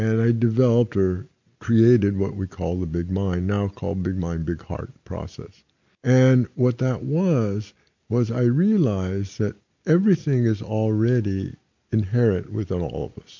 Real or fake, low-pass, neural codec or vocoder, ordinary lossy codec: real; 7.2 kHz; none; MP3, 48 kbps